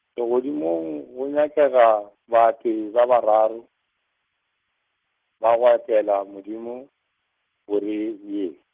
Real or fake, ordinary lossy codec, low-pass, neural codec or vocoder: real; Opus, 16 kbps; 3.6 kHz; none